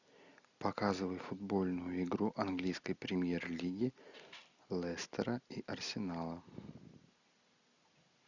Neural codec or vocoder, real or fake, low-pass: none; real; 7.2 kHz